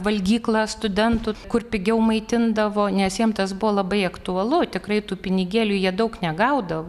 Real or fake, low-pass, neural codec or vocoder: real; 14.4 kHz; none